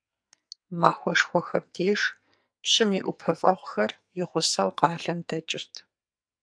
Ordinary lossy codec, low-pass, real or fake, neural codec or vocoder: AAC, 64 kbps; 9.9 kHz; fake; codec, 44.1 kHz, 2.6 kbps, SNAC